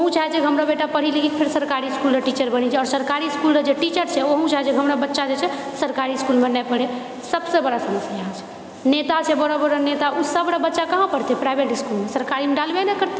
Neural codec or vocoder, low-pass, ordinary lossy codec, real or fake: none; none; none; real